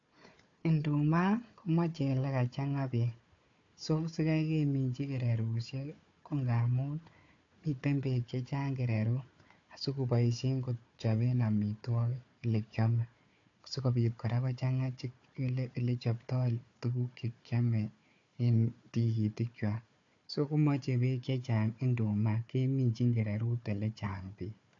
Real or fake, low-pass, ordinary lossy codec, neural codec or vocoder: fake; 7.2 kHz; AAC, 48 kbps; codec, 16 kHz, 4 kbps, FunCodec, trained on Chinese and English, 50 frames a second